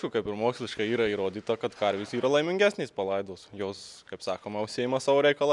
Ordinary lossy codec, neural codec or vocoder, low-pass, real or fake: MP3, 96 kbps; none; 10.8 kHz; real